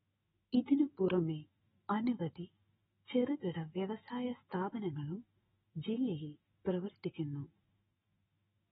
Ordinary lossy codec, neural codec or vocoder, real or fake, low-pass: AAC, 16 kbps; autoencoder, 48 kHz, 128 numbers a frame, DAC-VAE, trained on Japanese speech; fake; 19.8 kHz